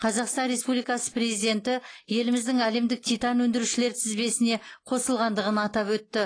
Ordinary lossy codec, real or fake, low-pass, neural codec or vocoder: AAC, 32 kbps; real; 9.9 kHz; none